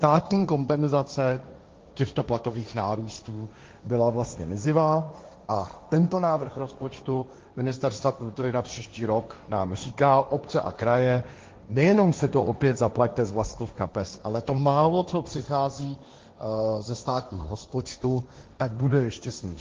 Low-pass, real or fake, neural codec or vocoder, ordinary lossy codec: 7.2 kHz; fake; codec, 16 kHz, 1.1 kbps, Voila-Tokenizer; Opus, 32 kbps